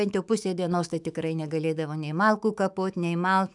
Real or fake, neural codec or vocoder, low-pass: fake; autoencoder, 48 kHz, 128 numbers a frame, DAC-VAE, trained on Japanese speech; 10.8 kHz